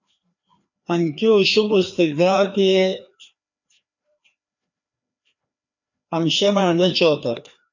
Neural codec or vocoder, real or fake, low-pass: codec, 16 kHz, 2 kbps, FreqCodec, larger model; fake; 7.2 kHz